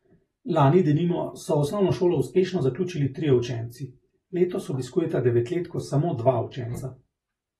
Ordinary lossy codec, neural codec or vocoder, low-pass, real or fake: AAC, 32 kbps; none; 19.8 kHz; real